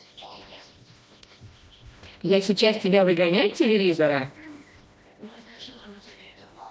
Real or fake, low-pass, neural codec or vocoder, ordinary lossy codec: fake; none; codec, 16 kHz, 1 kbps, FreqCodec, smaller model; none